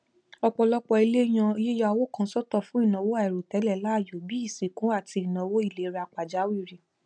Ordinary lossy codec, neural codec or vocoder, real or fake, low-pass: none; none; real; 9.9 kHz